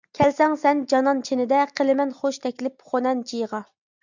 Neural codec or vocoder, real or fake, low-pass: none; real; 7.2 kHz